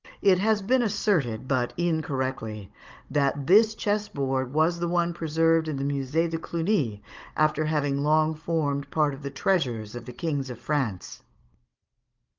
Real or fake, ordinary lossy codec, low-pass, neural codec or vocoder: fake; Opus, 24 kbps; 7.2 kHz; codec, 16 kHz, 16 kbps, FunCodec, trained on Chinese and English, 50 frames a second